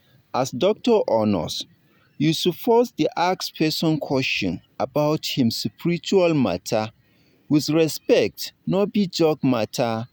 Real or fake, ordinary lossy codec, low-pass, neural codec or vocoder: real; none; none; none